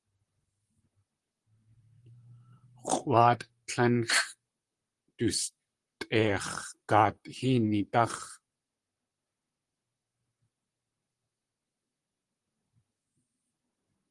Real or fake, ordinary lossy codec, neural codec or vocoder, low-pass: fake; Opus, 24 kbps; vocoder, 44.1 kHz, 128 mel bands, Pupu-Vocoder; 10.8 kHz